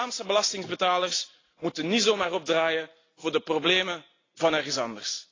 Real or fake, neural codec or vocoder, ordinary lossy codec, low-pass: real; none; AAC, 32 kbps; 7.2 kHz